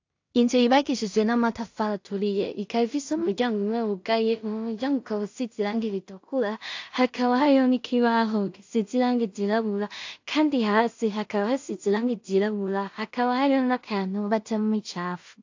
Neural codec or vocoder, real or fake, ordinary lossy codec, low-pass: codec, 16 kHz in and 24 kHz out, 0.4 kbps, LongCat-Audio-Codec, two codebook decoder; fake; AAC, 48 kbps; 7.2 kHz